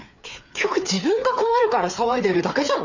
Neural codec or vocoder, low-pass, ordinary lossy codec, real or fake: codec, 16 kHz, 4 kbps, FreqCodec, larger model; 7.2 kHz; none; fake